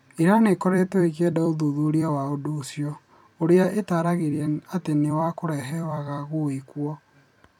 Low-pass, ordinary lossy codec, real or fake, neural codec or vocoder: 19.8 kHz; none; fake; vocoder, 44.1 kHz, 128 mel bands every 256 samples, BigVGAN v2